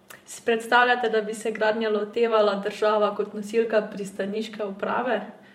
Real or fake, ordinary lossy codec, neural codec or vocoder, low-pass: fake; MP3, 64 kbps; vocoder, 44.1 kHz, 128 mel bands every 512 samples, BigVGAN v2; 19.8 kHz